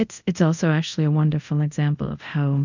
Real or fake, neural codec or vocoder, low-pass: fake; codec, 24 kHz, 0.5 kbps, DualCodec; 7.2 kHz